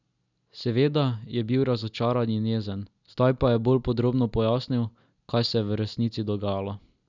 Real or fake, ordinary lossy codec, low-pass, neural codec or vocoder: real; none; 7.2 kHz; none